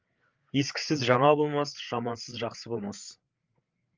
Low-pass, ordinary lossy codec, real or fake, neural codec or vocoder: 7.2 kHz; Opus, 32 kbps; fake; codec, 16 kHz, 8 kbps, FreqCodec, larger model